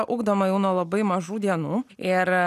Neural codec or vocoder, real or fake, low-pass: none; real; 14.4 kHz